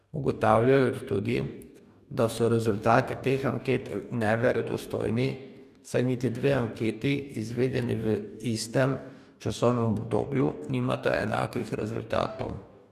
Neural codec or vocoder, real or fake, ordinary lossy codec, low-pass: codec, 44.1 kHz, 2.6 kbps, DAC; fake; none; 14.4 kHz